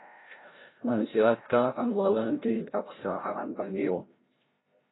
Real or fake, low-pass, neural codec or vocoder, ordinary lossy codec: fake; 7.2 kHz; codec, 16 kHz, 0.5 kbps, FreqCodec, larger model; AAC, 16 kbps